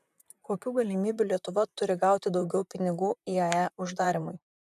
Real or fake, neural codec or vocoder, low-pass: fake; vocoder, 44.1 kHz, 128 mel bands, Pupu-Vocoder; 14.4 kHz